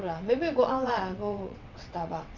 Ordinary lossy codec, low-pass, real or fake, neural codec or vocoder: none; 7.2 kHz; fake; vocoder, 22.05 kHz, 80 mel bands, WaveNeXt